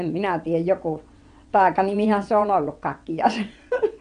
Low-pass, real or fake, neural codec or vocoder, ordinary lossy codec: 9.9 kHz; fake; vocoder, 22.05 kHz, 80 mel bands, WaveNeXt; AAC, 64 kbps